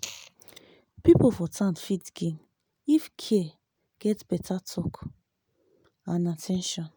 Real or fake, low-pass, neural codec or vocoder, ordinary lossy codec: real; none; none; none